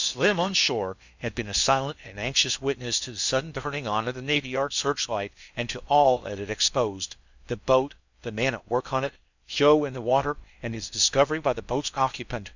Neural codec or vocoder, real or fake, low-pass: codec, 16 kHz in and 24 kHz out, 0.6 kbps, FocalCodec, streaming, 2048 codes; fake; 7.2 kHz